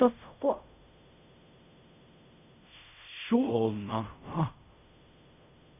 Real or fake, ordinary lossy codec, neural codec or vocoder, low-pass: fake; none; codec, 16 kHz in and 24 kHz out, 0.4 kbps, LongCat-Audio-Codec, fine tuned four codebook decoder; 3.6 kHz